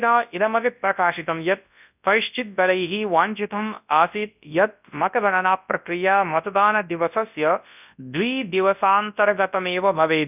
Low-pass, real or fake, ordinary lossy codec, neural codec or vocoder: 3.6 kHz; fake; none; codec, 24 kHz, 0.9 kbps, WavTokenizer, large speech release